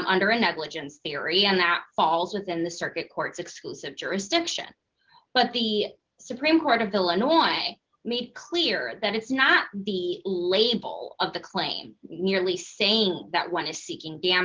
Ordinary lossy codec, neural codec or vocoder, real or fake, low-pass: Opus, 16 kbps; none; real; 7.2 kHz